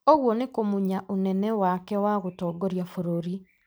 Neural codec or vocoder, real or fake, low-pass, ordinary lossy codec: none; real; none; none